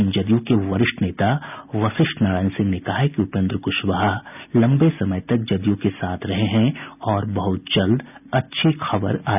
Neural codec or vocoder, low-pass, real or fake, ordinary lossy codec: none; 3.6 kHz; real; none